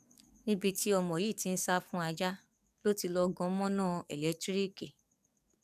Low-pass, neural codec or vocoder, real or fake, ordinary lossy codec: 14.4 kHz; codec, 44.1 kHz, 7.8 kbps, DAC; fake; none